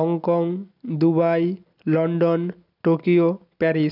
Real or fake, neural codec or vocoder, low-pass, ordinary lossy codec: real; none; 5.4 kHz; none